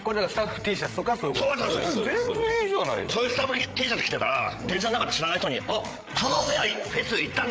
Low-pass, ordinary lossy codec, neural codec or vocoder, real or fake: none; none; codec, 16 kHz, 8 kbps, FreqCodec, larger model; fake